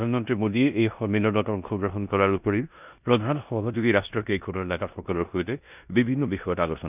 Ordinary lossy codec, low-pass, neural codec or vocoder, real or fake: none; 3.6 kHz; codec, 16 kHz in and 24 kHz out, 0.9 kbps, LongCat-Audio-Codec, four codebook decoder; fake